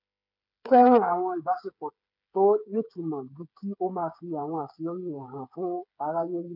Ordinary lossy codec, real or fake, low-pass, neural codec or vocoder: none; fake; 5.4 kHz; codec, 16 kHz, 8 kbps, FreqCodec, smaller model